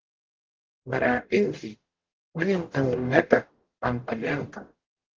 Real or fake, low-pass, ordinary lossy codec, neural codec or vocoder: fake; 7.2 kHz; Opus, 16 kbps; codec, 44.1 kHz, 0.9 kbps, DAC